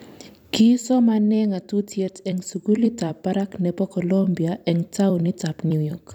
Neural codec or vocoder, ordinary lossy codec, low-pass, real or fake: vocoder, 44.1 kHz, 128 mel bands every 256 samples, BigVGAN v2; none; 19.8 kHz; fake